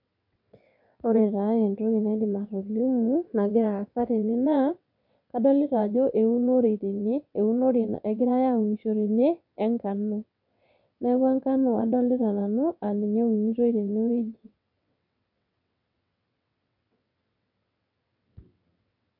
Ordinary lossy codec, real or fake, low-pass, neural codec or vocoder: none; fake; 5.4 kHz; vocoder, 22.05 kHz, 80 mel bands, WaveNeXt